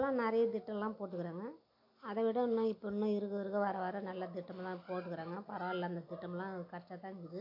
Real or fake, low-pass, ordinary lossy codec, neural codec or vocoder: real; 5.4 kHz; AAC, 32 kbps; none